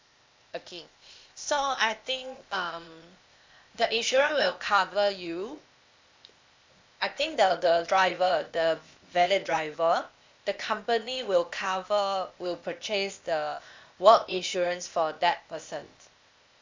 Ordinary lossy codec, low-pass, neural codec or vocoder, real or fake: MP3, 64 kbps; 7.2 kHz; codec, 16 kHz, 0.8 kbps, ZipCodec; fake